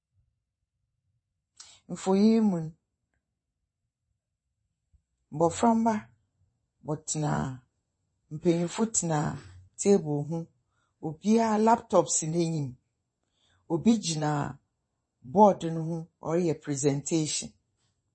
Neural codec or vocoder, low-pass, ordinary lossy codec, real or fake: vocoder, 24 kHz, 100 mel bands, Vocos; 9.9 kHz; MP3, 32 kbps; fake